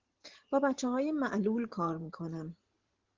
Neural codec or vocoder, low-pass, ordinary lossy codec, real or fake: vocoder, 44.1 kHz, 128 mel bands every 512 samples, BigVGAN v2; 7.2 kHz; Opus, 16 kbps; fake